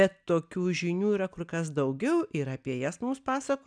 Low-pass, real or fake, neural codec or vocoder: 9.9 kHz; real; none